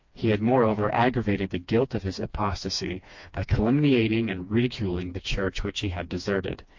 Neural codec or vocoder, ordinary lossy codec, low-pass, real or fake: codec, 16 kHz, 2 kbps, FreqCodec, smaller model; MP3, 48 kbps; 7.2 kHz; fake